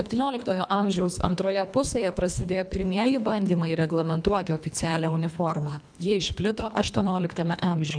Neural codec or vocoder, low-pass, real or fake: codec, 24 kHz, 1.5 kbps, HILCodec; 9.9 kHz; fake